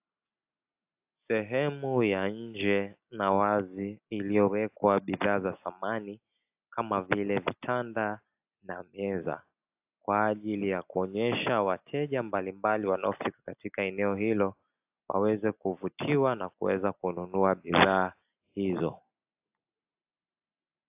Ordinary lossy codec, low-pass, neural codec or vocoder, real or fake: AAC, 32 kbps; 3.6 kHz; none; real